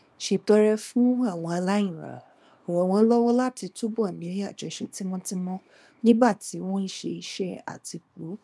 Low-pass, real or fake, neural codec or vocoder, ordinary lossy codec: none; fake; codec, 24 kHz, 0.9 kbps, WavTokenizer, small release; none